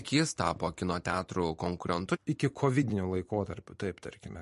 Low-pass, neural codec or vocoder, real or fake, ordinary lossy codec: 14.4 kHz; vocoder, 44.1 kHz, 128 mel bands every 256 samples, BigVGAN v2; fake; MP3, 48 kbps